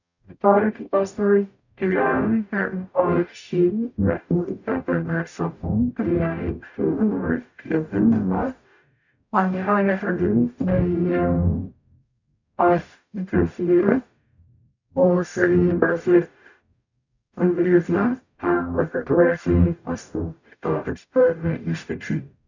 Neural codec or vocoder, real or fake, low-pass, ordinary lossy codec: codec, 44.1 kHz, 0.9 kbps, DAC; fake; 7.2 kHz; none